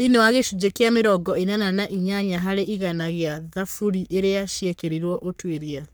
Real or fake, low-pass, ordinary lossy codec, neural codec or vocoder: fake; none; none; codec, 44.1 kHz, 3.4 kbps, Pupu-Codec